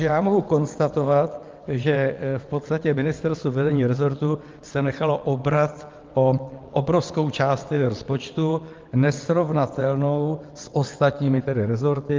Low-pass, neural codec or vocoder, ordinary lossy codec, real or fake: 7.2 kHz; vocoder, 22.05 kHz, 80 mel bands, WaveNeXt; Opus, 32 kbps; fake